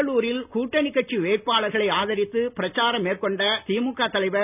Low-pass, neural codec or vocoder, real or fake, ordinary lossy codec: 3.6 kHz; none; real; none